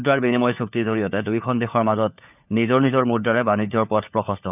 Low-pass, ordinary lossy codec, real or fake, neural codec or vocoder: 3.6 kHz; none; fake; codec, 16 kHz, 4 kbps, FunCodec, trained on LibriTTS, 50 frames a second